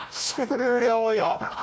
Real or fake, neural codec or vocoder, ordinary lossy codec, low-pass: fake; codec, 16 kHz, 1 kbps, FunCodec, trained on Chinese and English, 50 frames a second; none; none